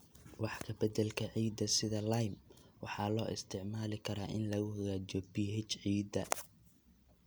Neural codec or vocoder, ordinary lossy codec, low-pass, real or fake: none; none; none; real